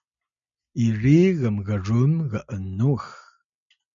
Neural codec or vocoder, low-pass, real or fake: none; 7.2 kHz; real